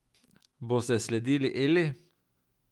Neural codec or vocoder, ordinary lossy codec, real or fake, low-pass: autoencoder, 48 kHz, 128 numbers a frame, DAC-VAE, trained on Japanese speech; Opus, 24 kbps; fake; 19.8 kHz